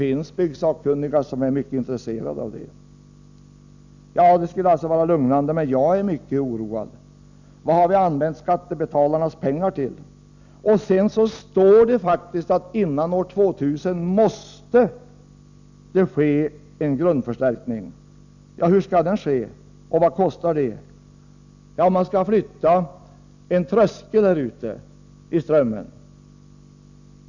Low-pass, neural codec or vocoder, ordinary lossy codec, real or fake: 7.2 kHz; none; none; real